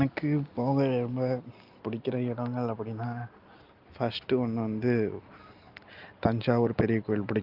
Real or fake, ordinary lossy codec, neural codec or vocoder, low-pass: real; Opus, 24 kbps; none; 5.4 kHz